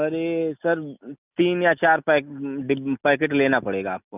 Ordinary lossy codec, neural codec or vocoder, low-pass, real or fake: none; none; 3.6 kHz; real